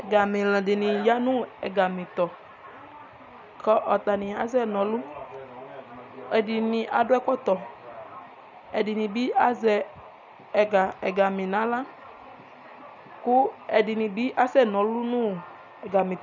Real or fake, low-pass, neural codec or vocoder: real; 7.2 kHz; none